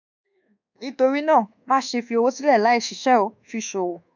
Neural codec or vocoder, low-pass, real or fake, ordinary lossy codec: codec, 24 kHz, 1.2 kbps, DualCodec; 7.2 kHz; fake; none